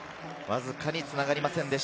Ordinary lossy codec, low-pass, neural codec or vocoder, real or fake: none; none; none; real